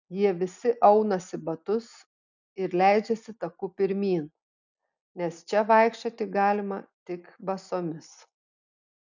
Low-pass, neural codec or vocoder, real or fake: 7.2 kHz; none; real